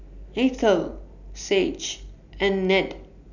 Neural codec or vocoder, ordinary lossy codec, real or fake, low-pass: none; none; real; 7.2 kHz